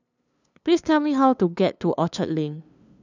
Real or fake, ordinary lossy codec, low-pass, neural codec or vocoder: fake; none; 7.2 kHz; codec, 16 kHz, 2 kbps, FunCodec, trained on LibriTTS, 25 frames a second